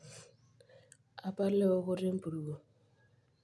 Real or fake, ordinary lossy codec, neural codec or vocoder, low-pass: real; none; none; none